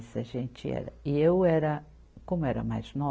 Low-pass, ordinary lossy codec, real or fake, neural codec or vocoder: none; none; real; none